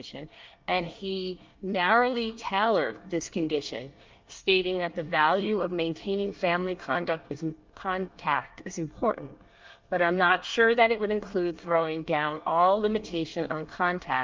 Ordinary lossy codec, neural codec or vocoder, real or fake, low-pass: Opus, 24 kbps; codec, 24 kHz, 1 kbps, SNAC; fake; 7.2 kHz